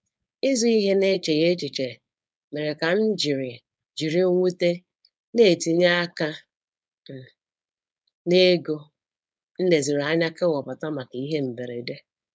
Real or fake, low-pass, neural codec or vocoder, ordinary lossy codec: fake; none; codec, 16 kHz, 4.8 kbps, FACodec; none